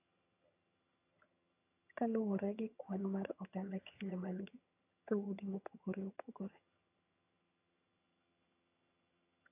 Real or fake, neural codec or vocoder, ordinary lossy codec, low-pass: fake; vocoder, 22.05 kHz, 80 mel bands, HiFi-GAN; AAC, 32 kbps; 3.6 kHz